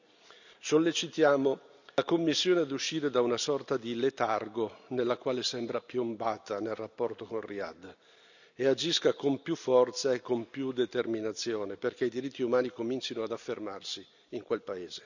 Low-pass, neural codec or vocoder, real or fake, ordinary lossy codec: 7.2 kHz; none; real; none